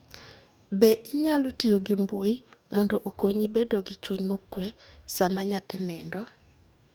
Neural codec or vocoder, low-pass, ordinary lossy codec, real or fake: codec, 44.1 kHz, 2.6 kbps, DAC; none; none; fake